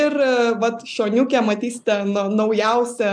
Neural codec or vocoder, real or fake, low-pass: none; real; 9.9 kHz